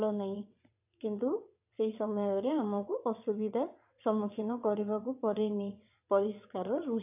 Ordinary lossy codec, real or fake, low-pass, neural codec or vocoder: AAC, 32 kbps; fake; 3.6 kHz; vocoder, 22.05 kHz, 80 mel bands, WaveNeXt